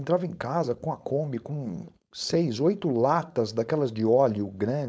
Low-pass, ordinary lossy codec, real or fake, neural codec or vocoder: none; none; fake; codec, 16 kHz, 4.8 kbps, FACodec